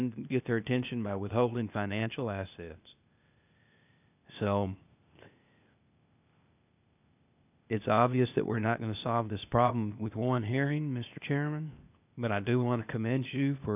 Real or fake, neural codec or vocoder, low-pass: fake; codec, 16 kHz, 0.8 kbps, ZipCodec; 3.6 kHz